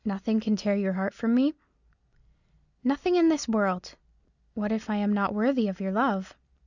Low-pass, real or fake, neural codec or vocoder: 7.2 kHz; real; none